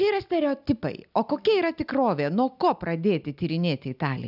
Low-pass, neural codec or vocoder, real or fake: 5.4 kHz; none; real